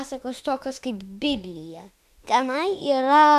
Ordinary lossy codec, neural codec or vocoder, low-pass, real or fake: MP3, 96 kbps; autoencoder, 48 kHz, 32 numbers a frame, DAC-VAE, trained on Japanese speech; 14.4 kHz; fake